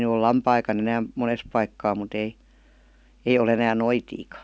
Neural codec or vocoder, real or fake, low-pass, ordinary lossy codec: none; real; none; none